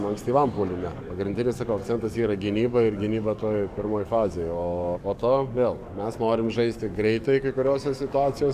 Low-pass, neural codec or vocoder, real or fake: 14.4 kHz; codec, 44.1 kHz, 7.8 kbps, DAC; fake